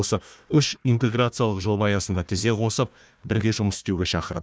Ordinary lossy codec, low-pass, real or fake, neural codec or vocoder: none; none; fake; codec, 16 kHz, 1 kbps, FunCodec, trained on Chinese and English, 50 frames a second